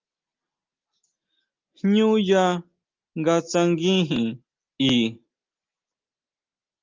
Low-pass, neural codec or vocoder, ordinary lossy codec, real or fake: 7.2 kHz; none; Opus, 32 kbps; real